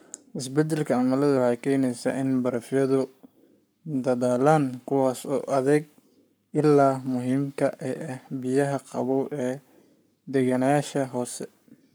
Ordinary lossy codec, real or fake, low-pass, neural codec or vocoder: none; fake; none; codec, 44.1 kHz, 7.8 kbps, Pupu-Codec